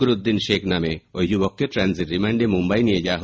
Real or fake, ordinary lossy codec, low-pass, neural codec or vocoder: real; none; none; none